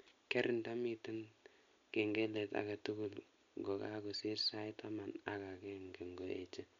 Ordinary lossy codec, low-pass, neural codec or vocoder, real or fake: MP3, 64 kbps; 7.2 kHz; none; real